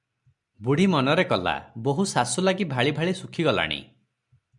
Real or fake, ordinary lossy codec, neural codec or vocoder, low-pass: real; MP3, 96 kbps; none; 10.8 kHz